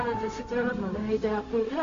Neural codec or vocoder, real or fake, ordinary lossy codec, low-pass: codec, 16 kHz, 0.4 kbps, LongCat-Audio-Codec; fake; AAC, 48 kbps; 7.2 kHz